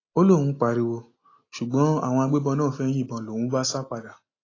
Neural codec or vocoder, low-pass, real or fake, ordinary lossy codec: none; 7.2 kHz; real; AAC, 32 kbps